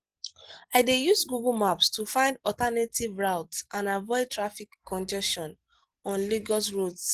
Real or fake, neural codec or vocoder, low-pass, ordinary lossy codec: real; none; 14.4 kHz; Opus, 16 kbps